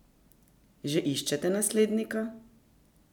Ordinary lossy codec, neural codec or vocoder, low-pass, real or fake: none; none; 19.8 kHz; real